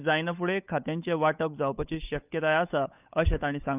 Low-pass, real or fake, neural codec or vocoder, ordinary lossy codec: 3.6 kHz; fake; codec, 16 kHz, 8 kbps, FunCodec, trained on Chinese and English, 25 frames a second; none